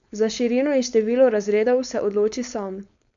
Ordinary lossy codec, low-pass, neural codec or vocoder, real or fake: none; 7.2 kHz; codec, 16 kHz, 4.8 kbps, FACodec; fake